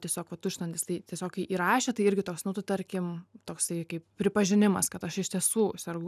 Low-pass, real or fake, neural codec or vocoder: 14.4 kHz; real; none